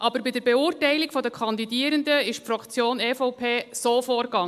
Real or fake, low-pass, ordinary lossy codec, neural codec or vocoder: real; 14.4 kHz; MP3, 96 kbps; none